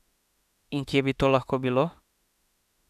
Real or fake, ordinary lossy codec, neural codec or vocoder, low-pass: fake; none; autoencoder, 48 kHz, 32 numbers a frame, DAC-VAE, trained on Japanese speech; 14.4 kHz